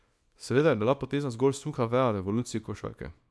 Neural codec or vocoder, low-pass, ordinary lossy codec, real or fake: codec, 24 kHz, 0.9 kbps, WavTokenizer, small release; none; none; fake